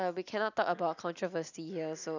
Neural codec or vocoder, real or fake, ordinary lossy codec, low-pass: none; real; none; 7.2 kHz